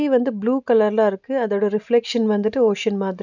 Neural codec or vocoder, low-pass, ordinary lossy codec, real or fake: none; 7.2 kHz; none; real